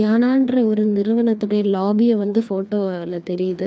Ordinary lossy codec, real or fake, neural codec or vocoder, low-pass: none; fake; codec, 16 kHz, 2 kbps, FreqCodec, larger model; none